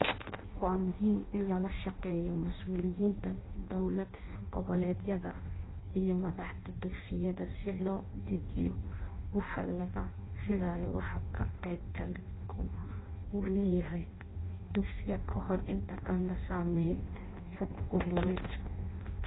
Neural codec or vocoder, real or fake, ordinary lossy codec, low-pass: codec, 16 kHz in and 24 kHz out, 0.6 kbps, FireRedTTS-2 codec; fake; AAC, 16 kbps; 7.2 kHz